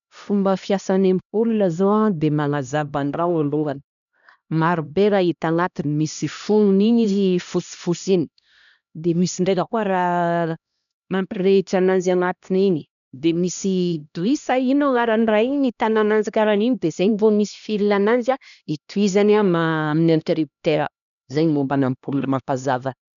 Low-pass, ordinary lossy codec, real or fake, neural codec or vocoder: 7.2 kHz; none; fake; codec, 16 kHz, 1 kbps, X-Codec, HuBERT features, trained on LibriSpeech